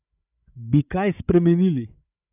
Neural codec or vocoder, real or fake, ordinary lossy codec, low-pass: codec, 16 kHz, 8 kbps, FreqCodec, larger model; fake; none; 3.6 kHz